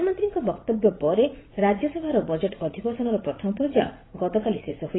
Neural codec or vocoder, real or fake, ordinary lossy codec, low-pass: vocoder, 44.1 kHz, 80 mel bands, Vocos; fake; AAC, 16 kbps; 7.2 kHz